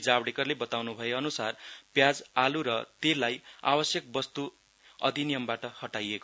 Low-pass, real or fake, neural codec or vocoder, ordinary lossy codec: none; real; none; none